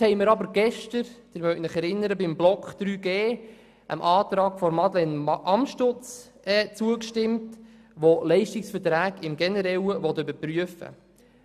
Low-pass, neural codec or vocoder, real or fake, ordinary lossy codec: 14.4 kHz; none; real; none